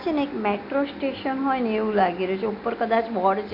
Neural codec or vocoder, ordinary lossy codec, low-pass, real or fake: none; MP3, 32 kbps; 5.4 kHz; real